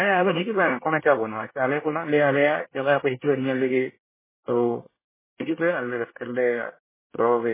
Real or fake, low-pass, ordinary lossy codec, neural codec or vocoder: fake; 3.6 kHz; MP3, 16 kbps; codec, 24 kHz, 1 kbps, SNAC